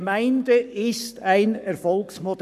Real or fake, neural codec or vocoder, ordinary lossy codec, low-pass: fake; codec, 44.1 kHz, 7.8 kbps, Pupu-Codec; none; 14.4 kHz